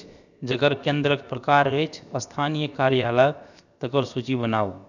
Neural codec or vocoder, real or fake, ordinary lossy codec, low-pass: codec, 16 kHz, 0.7 kbps, FocalCodec; fake; none; 7.2 kHz